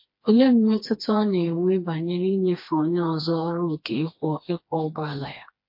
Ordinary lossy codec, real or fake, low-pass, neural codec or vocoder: MP3, 32 kbps; fake; 5.4 kHz; codec, 16 kHz, 2 kbps, FreqCodec, smaller model